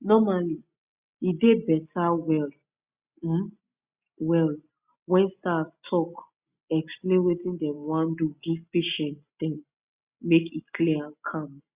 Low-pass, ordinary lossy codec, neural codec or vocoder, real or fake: 3.6 kHz; Opus, 64 kbps; none; real